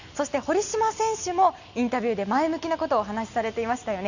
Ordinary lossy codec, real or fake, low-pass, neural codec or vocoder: none; real; 7.2 kHz; none